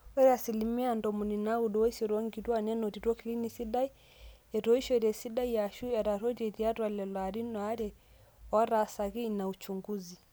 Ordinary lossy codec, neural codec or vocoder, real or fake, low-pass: none; none; real; none